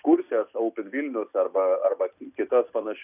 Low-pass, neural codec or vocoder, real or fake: 3.6 kHz; none; real